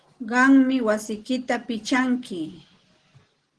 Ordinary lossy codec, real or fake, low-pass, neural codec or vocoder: Opus, 16 kbps; real; 9.9 kHz; none